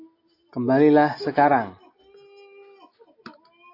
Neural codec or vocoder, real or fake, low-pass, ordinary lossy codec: none; real; 5.4 kHz; AAC, 32 kbps